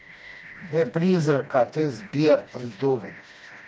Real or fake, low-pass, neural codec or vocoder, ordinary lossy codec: fake; none; codec, 16 kHz, 1 kbps, FreqCodec, smaller model; none